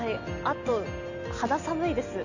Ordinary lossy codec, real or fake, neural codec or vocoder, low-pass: none; real; none; 7.2 kHz